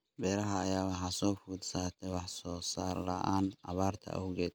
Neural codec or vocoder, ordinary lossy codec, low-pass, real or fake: none; none; none; real